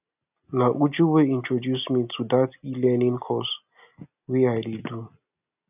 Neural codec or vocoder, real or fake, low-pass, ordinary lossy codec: none; real; 3.6 kHz; none